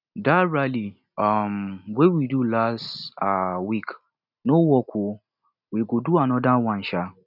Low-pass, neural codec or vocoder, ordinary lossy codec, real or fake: 5.4 kHz; none; none; real